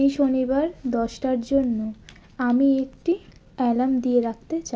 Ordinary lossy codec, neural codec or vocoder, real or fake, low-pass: none; none; real; none